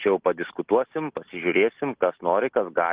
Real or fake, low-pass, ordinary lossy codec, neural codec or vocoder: real; 3.6 kHz; Opus, 16 kbps; none